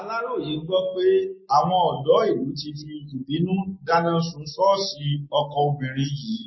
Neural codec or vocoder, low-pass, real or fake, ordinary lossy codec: none; 7.2 kHz; real; MP3, 24 kbps